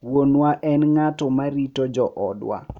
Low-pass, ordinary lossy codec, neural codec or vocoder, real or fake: 19.8 kHz; none; none; real